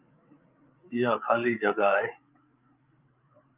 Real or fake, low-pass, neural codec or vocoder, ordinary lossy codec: fake; 3.6 kHz; codec, 16 kHz, 8 kbps, FreqCodec, larger model; Opus, 24 kbps